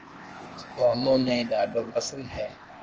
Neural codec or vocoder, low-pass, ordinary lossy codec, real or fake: codec, 16 kHz, 0.8 kbps, ZipCodec; 7.2 kHz; Opus, 24 kbps; fake